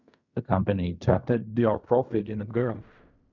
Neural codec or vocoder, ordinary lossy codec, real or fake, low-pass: codec, 16 kHz in and 24 kHz out, 0.4 kbps, LongCat-Audio-Codec, fine tuned four codebook decoder; none; fake; 7.2 kHz